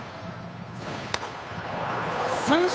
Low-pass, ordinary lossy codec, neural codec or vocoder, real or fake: none; none; codec, 16 kHz, 2 kbps, FunCodec, trained on Chinese and English, 25 frames a second; fake